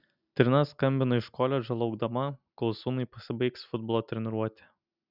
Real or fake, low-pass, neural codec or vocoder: real; 5.4 kHz; none